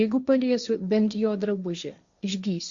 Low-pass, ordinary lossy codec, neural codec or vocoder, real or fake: 7.2 kHz; Opus, 64 kbps; codec, 16 kHz, 1.1 kbps, Voila-Tokenizer; fake